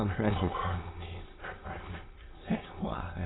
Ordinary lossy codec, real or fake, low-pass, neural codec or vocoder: AAC, 16 kbps; fake; 7.2 kHz; autoencoder, 22.05 kHz, a latent of 192 numbers a frame, VITS, trained on many speakers